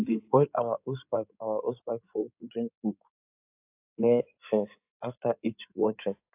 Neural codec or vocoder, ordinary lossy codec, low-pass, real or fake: codec, 16 kHz in and 24 kHz out, 2.2 kbps, FireRedTTS-2 codec; AAC, 32 kbps; 3.6 kHz; fake